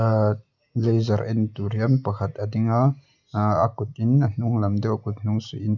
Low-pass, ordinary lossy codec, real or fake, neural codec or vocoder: 7.2 kHz; none; real; none